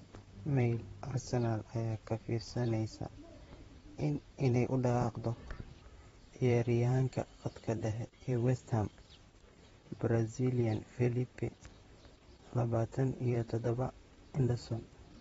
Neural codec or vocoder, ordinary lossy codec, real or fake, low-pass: vocoder, 44.1 kHz, 128 mel bands, Pupu-Vocoder; AAC, 24 kbps; fake; 19.8 kHz